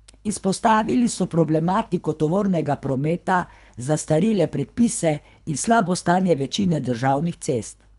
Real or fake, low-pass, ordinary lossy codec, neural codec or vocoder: fake; 10.8 kHz; none; codec, 24 kHz, 3 kbps, HILCodec